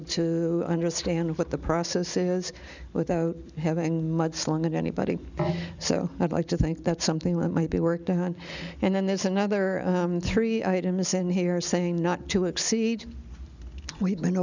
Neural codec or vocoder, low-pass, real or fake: none; 7.2 kHz; real